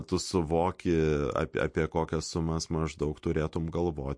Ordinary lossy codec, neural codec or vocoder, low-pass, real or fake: MP3, 48 kbps; none; 9.9 kHz; real